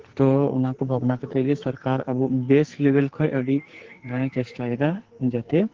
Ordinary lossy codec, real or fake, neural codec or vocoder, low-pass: Opus, 16 kbps; fake; codec, 44.1 kHz, 2.6 kbps, SNAC; 7.2 kHz